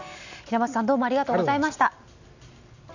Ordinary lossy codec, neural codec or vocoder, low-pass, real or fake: none; none; 7.2 kHz; real